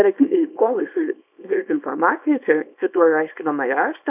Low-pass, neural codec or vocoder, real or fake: 3.6 kHz; codec, 24 kHz, 0.9 kbps, WavTokenizer, small release; fake